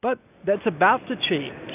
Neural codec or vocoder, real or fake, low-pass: none; real; 3.6 kHz